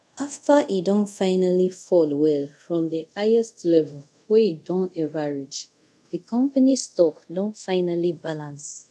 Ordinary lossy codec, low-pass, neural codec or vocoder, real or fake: none; none; codec, 24 kHz, 0.5 kbps, DualCodec; fake